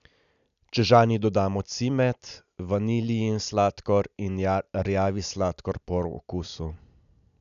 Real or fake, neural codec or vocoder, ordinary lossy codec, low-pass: real; none; none; 7.2 kHz